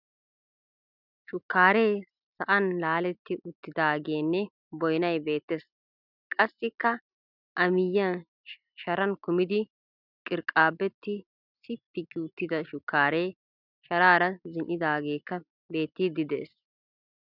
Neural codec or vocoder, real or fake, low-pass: none; real; 5.4 kHz